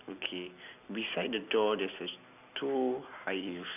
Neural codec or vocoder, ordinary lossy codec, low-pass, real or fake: none; none; 3.6 kHz; real